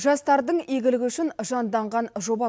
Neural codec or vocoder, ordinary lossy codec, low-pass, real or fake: none; none; none; real